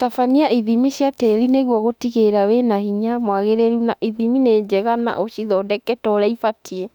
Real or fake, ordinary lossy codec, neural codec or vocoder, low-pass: fake; none; autoencoder, 48 kHz, 32 numbers a frame, DAC-VAE, trained on Japanese speech; 19.8 kHz